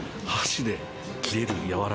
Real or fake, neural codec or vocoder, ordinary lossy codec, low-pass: real; none; none; none